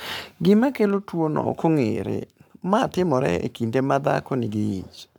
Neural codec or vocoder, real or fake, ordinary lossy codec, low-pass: codec, 44.1 kHz, 7.8 kbps, Pupu-Codec; fake; none; none